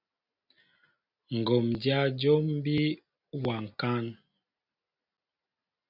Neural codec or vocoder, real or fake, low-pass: none; real; 5.4 kHz